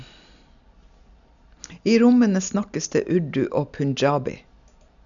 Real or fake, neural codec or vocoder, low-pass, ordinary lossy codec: real; none; 7.2 kHz; none